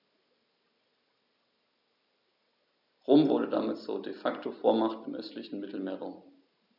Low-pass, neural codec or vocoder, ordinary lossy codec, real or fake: 5.4 kHz; none; none; real